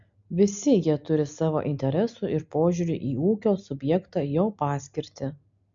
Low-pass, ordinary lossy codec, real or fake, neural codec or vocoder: 7.2 kHz; MP3, 64 kbps; real; none